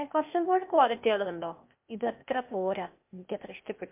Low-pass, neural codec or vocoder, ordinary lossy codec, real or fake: 3.6 kHz; codec, 16 kHz, 0.8 kbps, ZipCodec; MP3, 32 kbps; fake